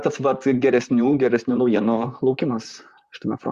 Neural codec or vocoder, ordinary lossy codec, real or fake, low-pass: vocoder, 44.1 kHz, 128 mel bands, Pupu-Vocoder; Opus, 64 kbps; fake; 14.4 kHz